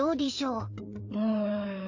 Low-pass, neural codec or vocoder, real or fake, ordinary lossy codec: 7.2 kHz; codec, 16 kHz, 4 kbps, FreqCodec, larger model; fake; MP3, 48 kbps